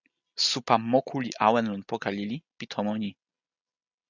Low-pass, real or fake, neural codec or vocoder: 7.2 kHz; real; none